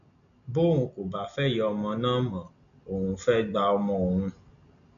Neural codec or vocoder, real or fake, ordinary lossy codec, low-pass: none; real; none; 7.2 kHz